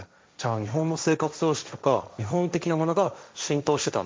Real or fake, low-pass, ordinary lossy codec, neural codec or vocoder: fake; none; none; codec, 16 kHz, 1.1 kbps, Voila-Tokenizer